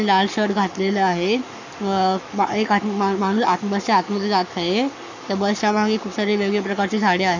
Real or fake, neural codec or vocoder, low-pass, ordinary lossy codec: fake; codec, 44.1 kHz, 7.8 kbps, Pupu-Codec; 7.2 kHz; none